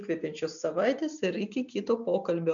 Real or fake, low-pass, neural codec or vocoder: real; 7.2 kHz; none